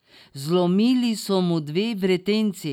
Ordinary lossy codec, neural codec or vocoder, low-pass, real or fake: none; none; 19.8 kHz; real